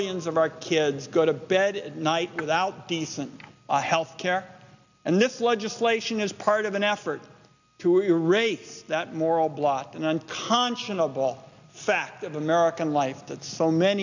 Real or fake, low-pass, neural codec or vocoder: real; 7.2 kHz; none